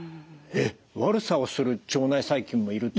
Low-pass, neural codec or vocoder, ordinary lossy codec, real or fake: none; none; none; real